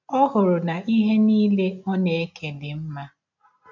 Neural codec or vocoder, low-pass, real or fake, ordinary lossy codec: none; 7.2 kHz; real; none